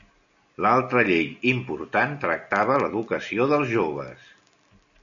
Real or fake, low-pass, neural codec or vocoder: real; 7.2 kHz; none